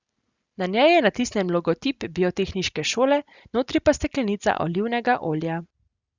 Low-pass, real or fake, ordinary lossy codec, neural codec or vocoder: 7.2 kHz; real; Opus, 64 kbps; none